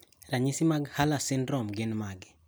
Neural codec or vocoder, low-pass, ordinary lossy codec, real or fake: none; none; none; real